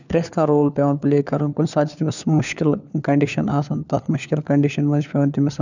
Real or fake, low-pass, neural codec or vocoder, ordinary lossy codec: fake; 7.2 kHz; codec, 16 kHz, 4 kbps, FunCodec, trained on LibriTTS, 50 frames a second; none